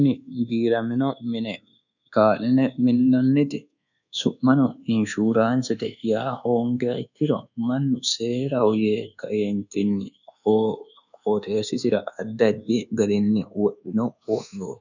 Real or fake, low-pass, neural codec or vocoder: fake; 7.2 kHz; codec, 24 kHz, 1.2 kbps, DualCodec